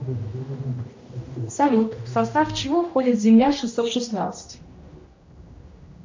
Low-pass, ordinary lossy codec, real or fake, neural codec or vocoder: 7.2 kHz; MP3, 48 kbps; fake; codec, 16 kHz, 1 kbps, X-Codec, HuBERT features, trained on general audio